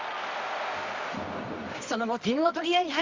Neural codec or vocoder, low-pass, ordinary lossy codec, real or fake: codec, 24 kHz, 0.9 kbps, WavTokenizer, medium music audio release; 7.2 kHz; Opus, 32 kbps; fake